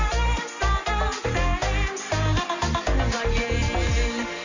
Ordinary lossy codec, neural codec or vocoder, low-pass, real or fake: none; none; 7.2 kHz; real